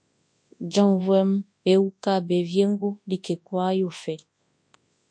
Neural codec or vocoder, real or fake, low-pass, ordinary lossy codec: codec, 24 kHz, 0.9 kbps, WavTokenizer, large speech release; fake; 9.9 kHz; MP3, 48 kbps